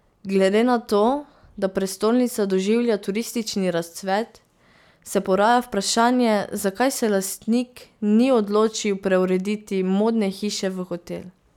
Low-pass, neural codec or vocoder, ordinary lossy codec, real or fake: 19.8 kHz; none; none; real